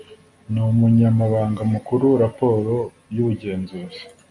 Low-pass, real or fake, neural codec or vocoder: 10.8 kHz; real; none